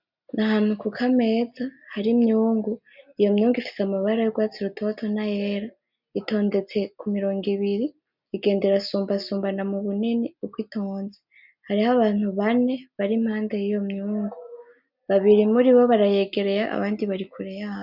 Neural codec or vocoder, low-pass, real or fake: none; 5.4 kHz; real